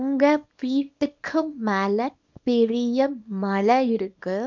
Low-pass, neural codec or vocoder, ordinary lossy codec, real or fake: 7.2 kHz; codec, 24 kHz, 0.9 kbps, WavTokenizer, small release; MP3, 64 kbps; fake